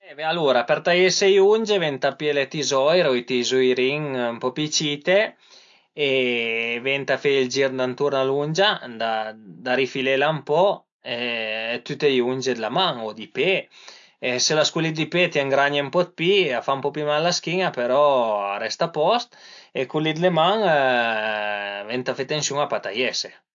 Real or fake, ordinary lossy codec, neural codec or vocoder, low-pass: real; AAC, 64 kbps; none; 7.2 kHz